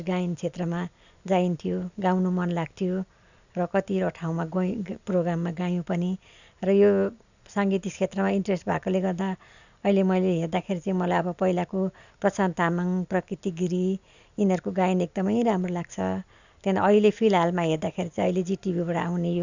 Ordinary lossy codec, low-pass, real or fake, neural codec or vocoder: none; 7.2 kHz; real; none